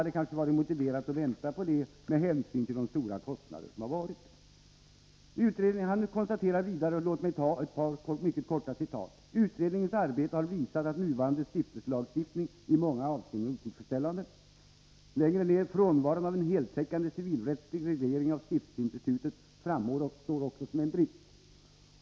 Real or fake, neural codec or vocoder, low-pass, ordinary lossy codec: real; none; none; none